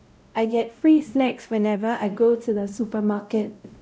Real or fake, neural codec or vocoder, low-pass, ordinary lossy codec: fake; codec, 16 kHz, 0.5 kbps, X-Codec, WavLM features, trained on Multilingual LibriSpeech; none; none